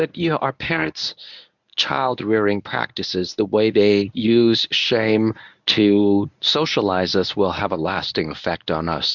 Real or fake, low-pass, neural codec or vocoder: fake; 7.2 kHz; codec, 24 kHz, 0.9 kbps, WavTokenizer, medium speech release version 1